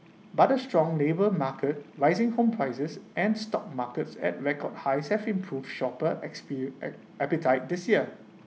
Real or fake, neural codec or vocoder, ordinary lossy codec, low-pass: real; none; none; none